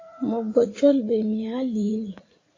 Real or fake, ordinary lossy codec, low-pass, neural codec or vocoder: fake; AAC, 32 kbps; 7.2 kHz; vocoder, 44.1 kHz, 80 mel bands, Vocos